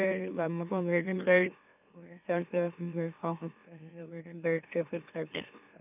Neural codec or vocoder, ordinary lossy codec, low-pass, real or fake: autoencoder, 44.1 kHz, a latent of 192 numbers a frame, MeloTTS; none; 3.6 kHz; fake